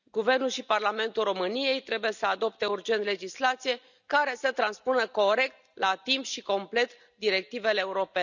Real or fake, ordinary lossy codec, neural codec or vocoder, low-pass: real; none; none; 7.2 kHz